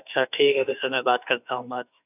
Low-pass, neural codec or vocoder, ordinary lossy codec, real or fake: 3.6 kHz; autoencoder, 48 kHz, 32 numbers a frame, DAC-VAE, trained on Japanese speech; none; fake